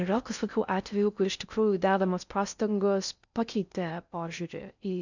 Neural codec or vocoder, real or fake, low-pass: codec, 16 kHz in and 24 kHz out, 0.6 kbps, FocalCodec, streaming, 4096 codes; fake; 7.2 kHz